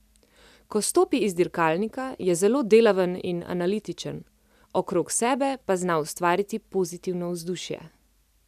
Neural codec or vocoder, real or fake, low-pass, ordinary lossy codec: none; real; 14.4 kHz; none